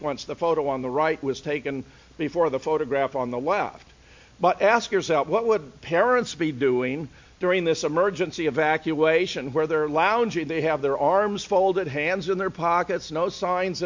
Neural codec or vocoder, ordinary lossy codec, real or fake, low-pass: none; MP3, 48 kbps; real; 7.2 kHz